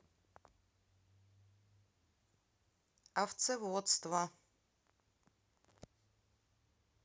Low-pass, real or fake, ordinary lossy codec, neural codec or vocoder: none; real; none; none